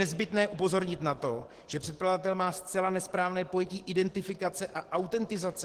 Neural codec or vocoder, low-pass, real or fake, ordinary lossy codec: codec, 44.1 kHz, 7.8 kbps, DAC; 14.4 kHz; fake; Opus, 16 kbps